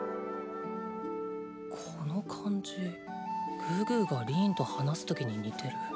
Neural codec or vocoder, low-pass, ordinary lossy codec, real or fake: none; none; none; real